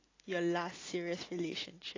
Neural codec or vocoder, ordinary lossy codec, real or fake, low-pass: none; AAC, 32 kbps; real; 7.2 kHz